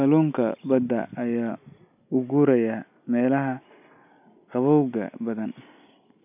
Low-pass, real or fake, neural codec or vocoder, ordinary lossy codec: 3.6 kHz; real; none; none